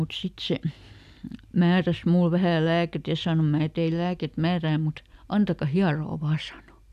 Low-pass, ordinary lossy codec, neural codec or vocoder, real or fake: 14.4 kHz; none; none; real